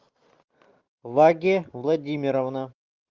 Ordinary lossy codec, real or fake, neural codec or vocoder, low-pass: Opus, 32 kbps; real; none; 7.2 kHz